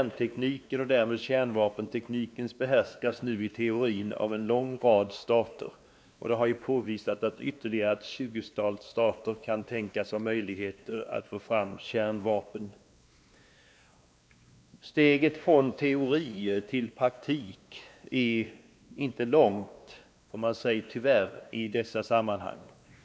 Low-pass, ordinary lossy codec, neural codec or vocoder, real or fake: none; none; codec, 16 kHz, 2 kbps, X-Codec, WavLM features, trained on Multilingual LibriSpeech; fake